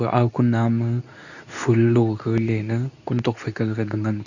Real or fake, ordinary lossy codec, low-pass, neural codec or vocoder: fake; none; 7.2 kHz; codec, 24 kHz, 0.9 kbps, WavTokenizer, medium speech release version 2